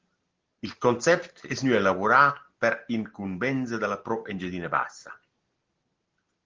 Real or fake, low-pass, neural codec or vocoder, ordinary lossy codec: real; 7.2 kHz; none; Opus, 16 kbps